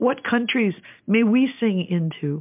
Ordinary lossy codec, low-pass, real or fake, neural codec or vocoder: MP3, 32 kbps; 3.6 kHz; real; none